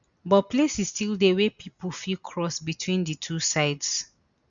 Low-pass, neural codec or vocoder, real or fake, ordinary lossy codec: 7.2 kHz; none; real; none